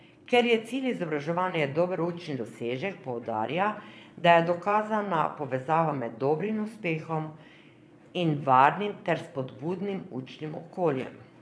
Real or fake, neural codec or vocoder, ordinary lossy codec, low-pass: fake; vocoder, 22.05 kHz, 80 mel bands, Vocos; none; none